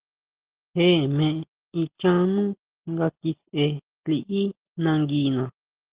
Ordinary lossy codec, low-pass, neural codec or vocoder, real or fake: Opus, 16 kbps; 3.6 kHz; none; real